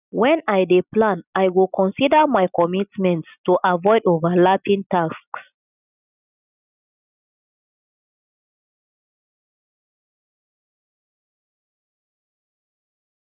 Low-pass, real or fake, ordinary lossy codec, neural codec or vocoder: 3.6 kHz; real; none; none